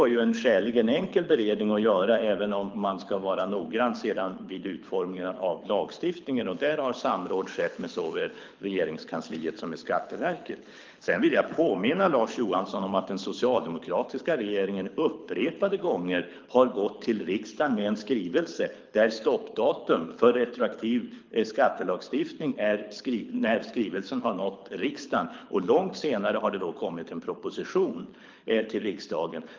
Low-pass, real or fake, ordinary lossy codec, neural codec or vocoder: 7.2 kHz; fake; Opus, 24 kbps; codec, 24 kHz, 6 kbps, HILCodec